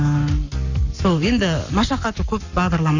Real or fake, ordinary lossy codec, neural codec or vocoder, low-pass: fake; none; codec, 44.1 kHz, 7.8 kbps, Pupu-Codec; 7.2 kHz